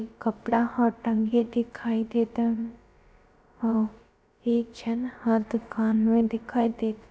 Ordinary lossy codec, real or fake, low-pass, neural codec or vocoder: none; fake; none; codec, 16 kHz, about 1 kbps, DyCAST, with the encoder's durations